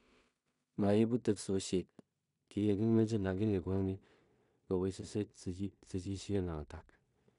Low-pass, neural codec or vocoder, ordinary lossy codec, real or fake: 10.8 kHz; codec, 16 kHz in and 24 kHz out, 0.4 kbps, LongCat-Audio-Codec, two codebook decoder; none; fake